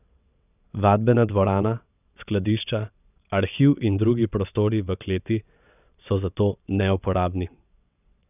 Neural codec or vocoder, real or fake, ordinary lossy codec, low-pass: vocoder, 22.05 kHz, 80 mel bands, WaveNeXt; fake; none; 3.6 kHz